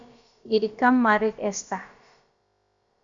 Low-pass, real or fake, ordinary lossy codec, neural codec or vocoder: 7.2 kHz; fake; Opus, 64 kbps; codec, 16 kHz, about 1 kbps, DyCAST, with the encoder's durations